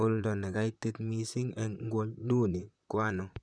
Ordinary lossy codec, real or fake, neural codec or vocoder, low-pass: none; fake; vocoder, 44.1 kHz, 128 mel bands, Pupu-Vocoder; 9.9 kHz